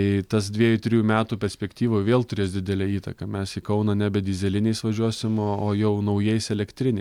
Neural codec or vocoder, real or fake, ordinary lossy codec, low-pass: none; real; MP3, 96 kbps; 19.8 kHz